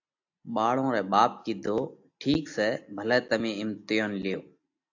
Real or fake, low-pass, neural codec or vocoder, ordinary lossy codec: real; 7.2 kHz; none; AAC, 48 kbps